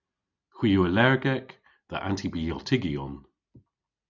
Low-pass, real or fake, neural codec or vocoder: 7.2 kHz; real; none